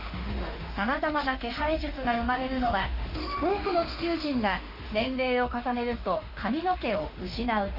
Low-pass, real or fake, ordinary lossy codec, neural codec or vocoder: 5.4 kHz; fake; Opus, 64 kbps; autoencoder, 48 kHz, 32 numbers a frame, DAC-VAE, trained on Japanese speech